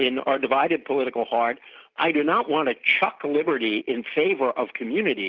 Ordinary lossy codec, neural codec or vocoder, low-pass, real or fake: Opus, 24 kbps; none; 7.2 kHz; real